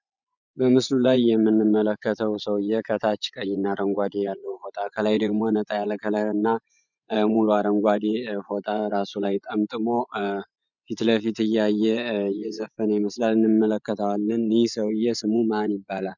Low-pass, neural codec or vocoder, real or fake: 7.2 kHz; vocoder, 24 kHz, 100 mel bands, Vocos; fake